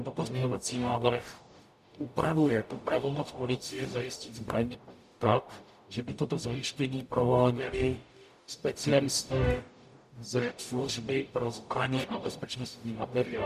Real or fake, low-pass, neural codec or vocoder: fake; 14.4 kHz; codec, 44.1 kHz, 0.9 kbps, DAC